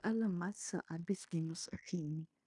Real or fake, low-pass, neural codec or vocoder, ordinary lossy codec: fake; 10.8 kHz; codec, 16 kHz in and 24 kHz out, 0.9 kbps, LongCat-Audio-Codec, fine tuned four codebook decoder; none